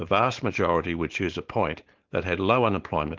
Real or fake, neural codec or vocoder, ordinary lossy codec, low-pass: fake; codec, 16 kHz, 4.8 kbps, FACodec; Opus, 16 kbps; 7.2 kHz